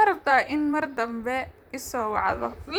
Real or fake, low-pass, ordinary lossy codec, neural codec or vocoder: fake; none; none; vocoder, 44.1 kHz, 128 mel bands, Pupu-Vocoder